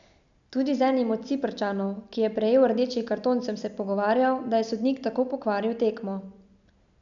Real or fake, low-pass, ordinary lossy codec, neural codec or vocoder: real; 7.2 kHz; none; none